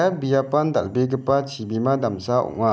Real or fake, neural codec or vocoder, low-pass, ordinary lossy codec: real; none; none; none